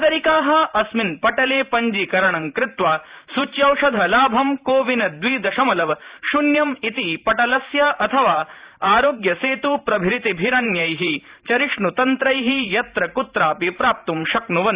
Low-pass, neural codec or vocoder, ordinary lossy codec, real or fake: 3.6 kHz; vocoder, 44.1 kHz, 128 mel bands every 512 samples, BigVGAN v2; Opus, 64 kbps; fake